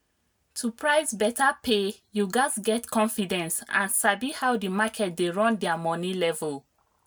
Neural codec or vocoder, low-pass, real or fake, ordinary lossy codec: none; none; real; none